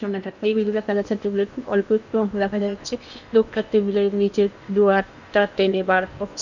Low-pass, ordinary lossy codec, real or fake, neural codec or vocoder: 7.2 kHz; none; fake; codec, 16 kHz in and 24 kHz out, 0.8 kbps, FocalCodec, streaming, 65536 codes